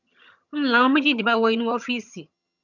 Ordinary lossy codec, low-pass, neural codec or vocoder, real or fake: none; 7.2 kHz; vocoder, 22.05 kHz, 80 mel bands, HiFi-GAN; fake